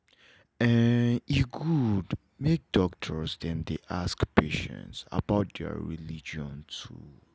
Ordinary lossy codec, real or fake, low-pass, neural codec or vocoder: none; real; none; none